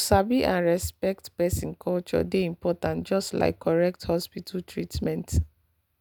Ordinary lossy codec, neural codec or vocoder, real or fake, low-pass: none; none; real; none